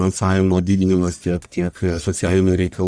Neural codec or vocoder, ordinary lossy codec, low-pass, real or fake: codec, 44.1 kHz, 1.7 kbps, Pupu-Codec; Opus, 64 kbps; 9.9 kHz; fake